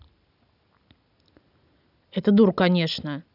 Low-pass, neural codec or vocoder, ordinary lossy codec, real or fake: 5.4 kHz; none; none; real